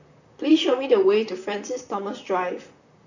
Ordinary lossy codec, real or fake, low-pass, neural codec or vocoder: none; fake; 7.2 kHz; vocoder, 44.1 kHz, 128 mel bands, Pupu-Vocoder